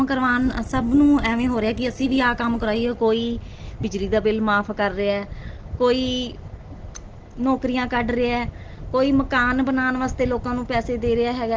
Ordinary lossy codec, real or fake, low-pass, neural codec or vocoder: Opus, 16 kbps; real; 7.2 kHz; none